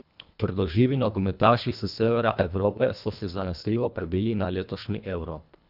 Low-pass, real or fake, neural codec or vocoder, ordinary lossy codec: 5.4 kHz; fake; codec, 24 kHz, 1.5 kbps, HILCodec; none